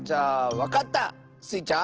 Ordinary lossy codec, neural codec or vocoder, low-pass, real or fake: Opus, 16 kbps; none; 7.2 kHz; real